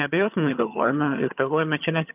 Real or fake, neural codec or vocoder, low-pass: fake; vocoder, 22.05 kHz, 80 mel bands, HiFi-GAN; 3.6 kHz